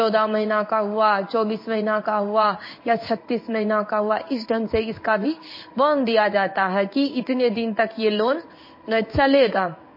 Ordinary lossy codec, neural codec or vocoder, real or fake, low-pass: MP3, 24 kbps; codec, 16 kHz in and 24 kHz out, 1 kbps, XY-Tokenizer; fake; 5.4 kHz